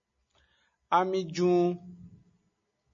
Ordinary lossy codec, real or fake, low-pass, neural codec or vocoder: MP3, 32 kbps; real; 7.2 kHz; none